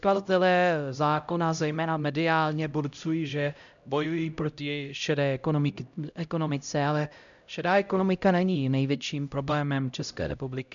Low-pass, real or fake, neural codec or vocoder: 7.2 kHz; fake; codec, 16 kHz, 0.5 kbps, X-Codec, HuBERT features, trained on LibriSpeech